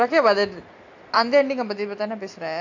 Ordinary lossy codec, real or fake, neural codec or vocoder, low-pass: AAC, 48 kbps; real; none; 7.2 kHz